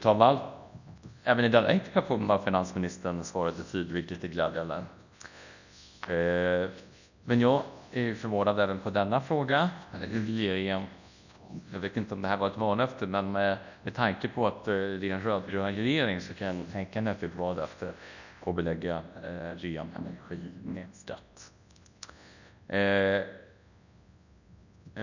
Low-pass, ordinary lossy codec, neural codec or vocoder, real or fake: 7.2 kHz; none; codec, 24 kHz, 0.9 kbps, WavTokenizer, large speech release; fake